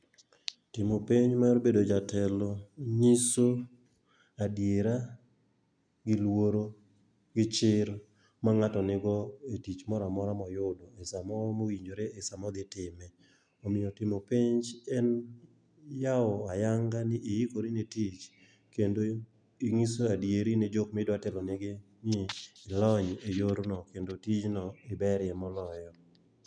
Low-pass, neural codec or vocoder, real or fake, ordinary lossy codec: 9.9 kHz; none; real; none